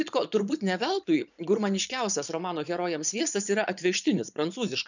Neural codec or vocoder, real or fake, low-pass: vocoder, 22.05 kHz, 80 mel bands, Vocos; fake; 7.2 kHz